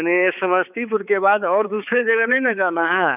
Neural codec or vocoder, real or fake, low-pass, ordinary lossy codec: codec, 16 kHz, 4 kbps, X-Codec, HuBERT features, trained on balanced general audio; fake; 3.6 kHz; none